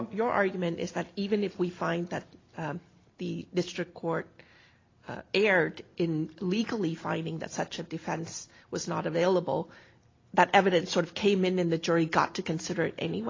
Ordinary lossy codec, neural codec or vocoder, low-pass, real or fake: AAC, 32 kbps; none; 7.2 kHz; real